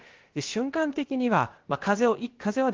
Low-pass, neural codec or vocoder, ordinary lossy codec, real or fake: 7.2 kHz; codec, 16 kHz, about 1 kbps, DyCAST, with the encoder's durations; Opus, 16 kbps; fake